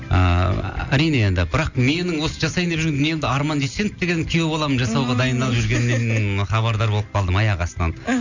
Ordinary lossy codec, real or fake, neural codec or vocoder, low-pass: none; real; none; 7.2 kHz